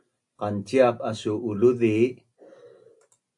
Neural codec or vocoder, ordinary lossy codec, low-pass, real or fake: none; AAC, 64 kbps; 10.8 kHz; real